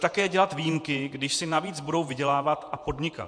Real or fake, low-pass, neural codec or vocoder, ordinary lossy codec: fake; 9.9 kHz; vocoder, 48 kHz, 128 mel bands, Vocos; MP3, 64 kbps